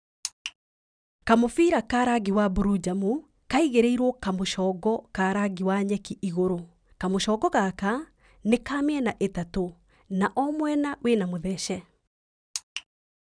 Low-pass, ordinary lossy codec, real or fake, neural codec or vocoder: 9.9 kHz; none; real; none